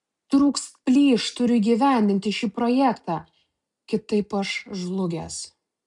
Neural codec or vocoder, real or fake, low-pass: none; real; 10.8 kHz